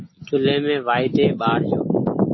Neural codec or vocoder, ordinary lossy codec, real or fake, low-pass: none; MP3, 24 kbps; real; 7.2 kHz